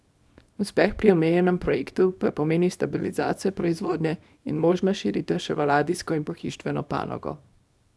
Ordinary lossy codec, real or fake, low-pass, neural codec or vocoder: none; fake; none; codec, 24 kHz, 0.9 kbps, WavTokenizer, small release